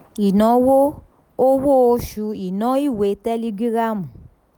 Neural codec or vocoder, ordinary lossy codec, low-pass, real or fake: none; none; none; real